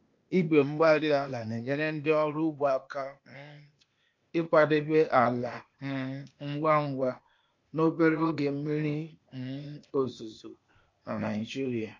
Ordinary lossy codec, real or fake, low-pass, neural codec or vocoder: MP3, 48 kbps; fake; 7.2 kHz; codec, 16 kHz, 0.8 kbps, ZipCodec